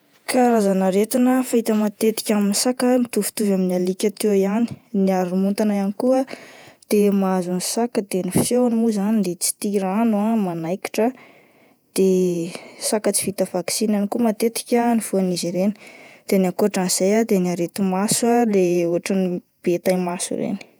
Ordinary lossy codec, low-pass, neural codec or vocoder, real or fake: none; none; vocoder, 48 kHz, 128 mel bands, Vocos; fake